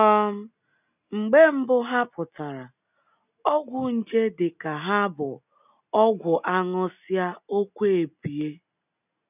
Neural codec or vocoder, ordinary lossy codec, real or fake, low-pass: none; none; real; 3.6 kHz